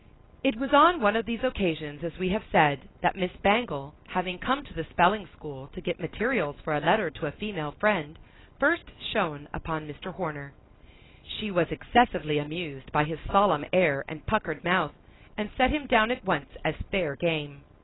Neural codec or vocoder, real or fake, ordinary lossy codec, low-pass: none; real; AAC, 16 kbps; 7.2 kHz